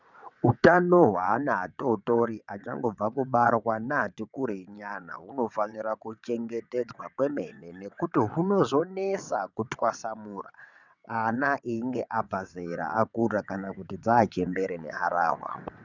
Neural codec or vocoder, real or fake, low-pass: vocoder, 22.05 kHz, 80 mel bands, WaveNeXt; fake; 7.2 kHz